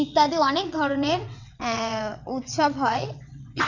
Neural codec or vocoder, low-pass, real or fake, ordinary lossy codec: vocoder, 22.05 kHz, 80 mel bands, WaveNeXt; 7.2 kHz; fake; none